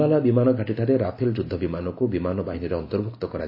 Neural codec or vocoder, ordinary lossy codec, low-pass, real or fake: none; AAC, 32 kbps; 5.4 kHz; real